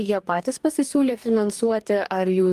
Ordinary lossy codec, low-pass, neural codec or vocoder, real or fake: Opus, 32 kbps; 14.4 kHz; codec, 44.1 kHz, 2.6 kbps, DAC; fake